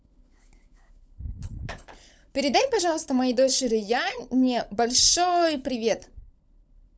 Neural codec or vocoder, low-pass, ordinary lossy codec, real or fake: codec, 16 kHz, 16 kbps, FunCodec, trained on LibriTTS, 50 frames a second; none; none; fake